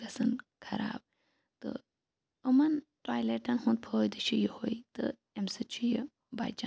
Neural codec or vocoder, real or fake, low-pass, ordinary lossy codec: none; real; none; none